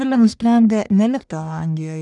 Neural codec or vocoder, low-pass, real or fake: codec, 44.1 kHz, 1.7 kbps, Pupu-Codec; 10.8 kHz; fake